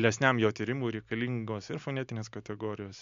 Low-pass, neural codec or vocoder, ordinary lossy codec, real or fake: 7.2 kHz; none; MP3, 64 kbps; real